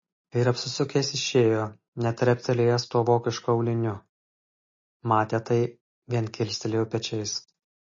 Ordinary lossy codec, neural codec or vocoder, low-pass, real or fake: MP3, 32 kbps; none; 7.2 kHz; real